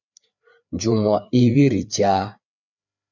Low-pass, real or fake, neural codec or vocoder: 7.2 kHz; fake; codec, 16 kHz, 4 kbps, FreqCodec, larger model